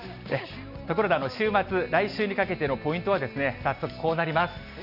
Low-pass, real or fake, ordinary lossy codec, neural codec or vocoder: 5.4 kHz; real; none; none